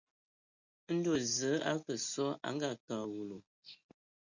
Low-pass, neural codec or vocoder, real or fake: 7.2 kHz; none; real